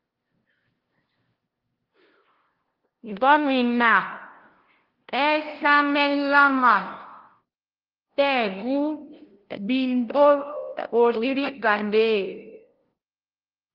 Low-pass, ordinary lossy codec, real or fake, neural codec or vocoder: 5.4 kHz; Opus, 16 kbps; fake; codec, 16 kHz, 0.5 kbps, FunCodec, trained on LibriTTS, 25 frames a second